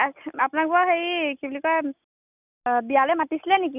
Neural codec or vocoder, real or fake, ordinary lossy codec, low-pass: none; real; none; 3.6 kHz